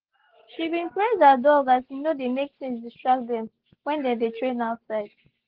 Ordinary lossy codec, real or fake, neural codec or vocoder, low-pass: Opus, 16 kbps; real; none; 5.4 kHz